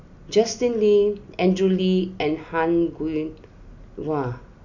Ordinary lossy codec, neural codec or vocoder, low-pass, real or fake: none; none; 7.2 kHz; real